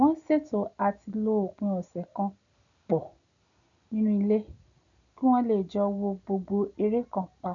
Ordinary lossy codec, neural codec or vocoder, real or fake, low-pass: none; none; real; 7.2 kHz